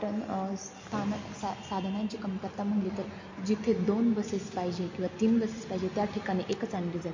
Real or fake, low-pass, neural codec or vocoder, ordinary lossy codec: real; 7.2 kHz; none; MP3, 32 kbps